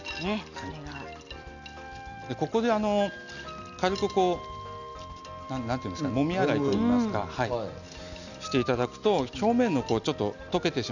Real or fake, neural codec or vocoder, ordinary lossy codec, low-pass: real; none; none; 7.2 kHz